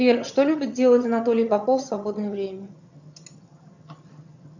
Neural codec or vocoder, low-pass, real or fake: vocoder, 22.05 kHz, 80 mel bands, HiFi-GAN; 7.2 kHz; fake